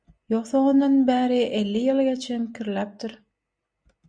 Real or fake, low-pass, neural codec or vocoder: real; 9.9 kHz; none